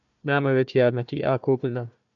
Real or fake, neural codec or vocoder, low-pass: fake; codec, 16 kHz, 1 kbps, FunCodec, trained on Chinese and English, 50 frames a second; 7.2 kHz